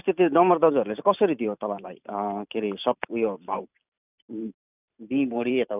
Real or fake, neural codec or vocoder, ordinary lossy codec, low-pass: fake; vocoder, 44.1 kHz, 128 mel bands every 512 samples, BigVGAN v2; none; 3.6 kHz